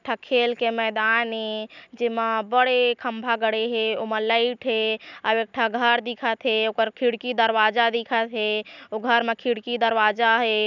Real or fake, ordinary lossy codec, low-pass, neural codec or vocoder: real; none; 7.2 kHz; none